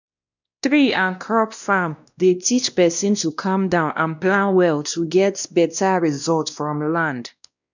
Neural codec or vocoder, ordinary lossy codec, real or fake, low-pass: codec, 16 kHz, 1 kbps, X-Codec, WavLM features, trained on Multilingual LibriSpeech; none; fake; 7.2 kHz